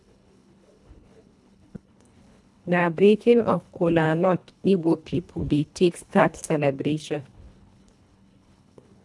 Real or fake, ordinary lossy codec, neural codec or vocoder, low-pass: fake; none; codec, 24 kHz, 1.5 kbps, HILCodec; none